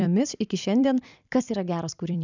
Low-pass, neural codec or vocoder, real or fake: 7.2 kHz; vocoder, 44.1 kHz, 128 mel bands every 256 samples, BigVGAN v2; fake